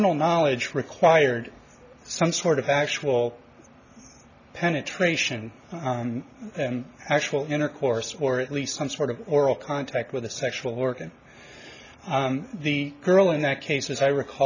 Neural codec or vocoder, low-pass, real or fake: none; 7.2 kHz; real